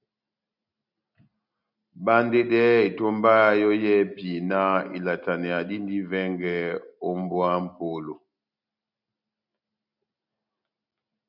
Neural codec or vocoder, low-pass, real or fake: none; 5.4 kHz; real